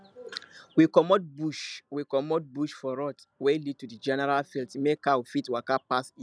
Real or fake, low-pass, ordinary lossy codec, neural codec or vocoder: real; none; none; none